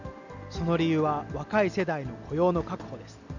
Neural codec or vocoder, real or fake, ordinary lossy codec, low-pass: none; real; none; 7.2 kHz